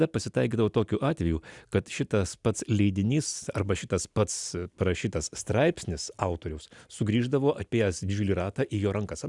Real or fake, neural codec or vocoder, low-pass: real; none; 10.8 kHz